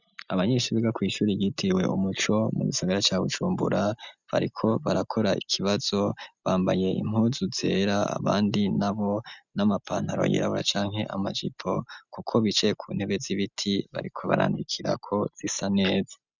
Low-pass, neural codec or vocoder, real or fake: 7.2 kHz; none; real